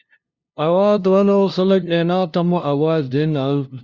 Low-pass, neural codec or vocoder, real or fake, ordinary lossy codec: 7.2 kHz; codec, 16 kHz, 0.5 kbps, FunCodec, trained on LibriTTS, 25 frames a second; fake; Opus, 64 kbps